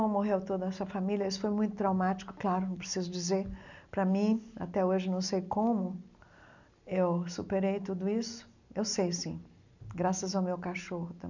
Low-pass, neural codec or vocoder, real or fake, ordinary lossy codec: 7.2 kHz; none; real; none